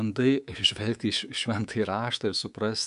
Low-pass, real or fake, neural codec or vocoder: 10.8 kHz; fake; codec, 24 kHz, 3.1 kbps, DualCodec